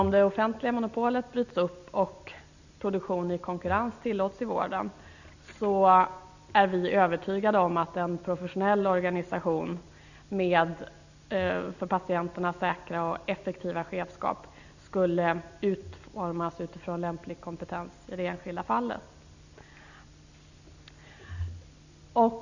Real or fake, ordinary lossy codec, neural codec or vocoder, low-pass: real; none; none; 7.2 kHz